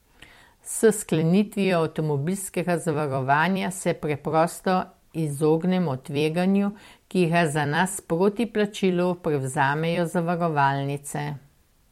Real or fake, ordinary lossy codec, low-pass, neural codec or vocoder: fake; MP3, 64 kbps; 19.8 kHz; vocoder, 44.1 kHz, 128 mel bands every 256 samples, BigVGAN v2